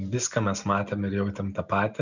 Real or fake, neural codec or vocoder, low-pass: real; none; 7.2 kHz